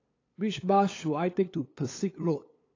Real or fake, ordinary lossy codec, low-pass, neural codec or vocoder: fake; AAC, 32 kbps; 7.2 kHz; codec, 16 kHz, 8 kbps, FunCodec, trained on LibriTTS, 25 frames a second